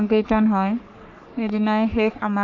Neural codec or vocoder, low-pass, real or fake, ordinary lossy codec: codec, 44.1 kHz, 3.4 kbps, Pupu-Codec; 7.2 kHz; fake; none